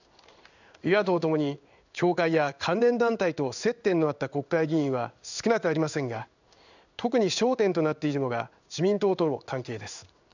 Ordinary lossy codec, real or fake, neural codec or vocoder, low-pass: none; fake; codec, 16 kHz in and 24 kHz out, 1 kbps, XY-Tokenizer; 7.2 kHz